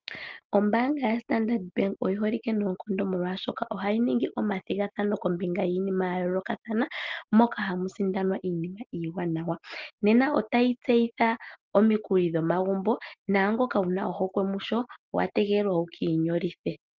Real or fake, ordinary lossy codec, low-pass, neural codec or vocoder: real; Opus, 32 kbps; 7.2 kHz; none